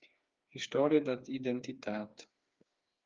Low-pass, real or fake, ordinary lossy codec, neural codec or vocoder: 7.2 kHz; fake; Opus, 32 kbps; codec, 16 kHz, 4 kbps, FreqCodec, smaller model